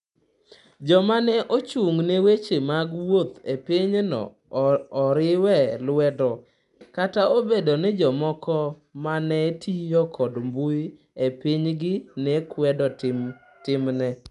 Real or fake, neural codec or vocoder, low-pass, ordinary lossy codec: real; none; 10.8 kHz; none